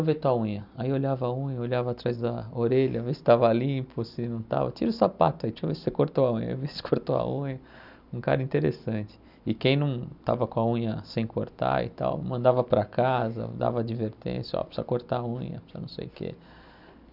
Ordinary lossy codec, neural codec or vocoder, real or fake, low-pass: none; none; real; 5.4 kHz